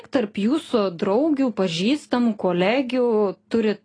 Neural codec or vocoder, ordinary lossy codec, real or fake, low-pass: none; AAC, 32 kbps; real; 9.9 kHz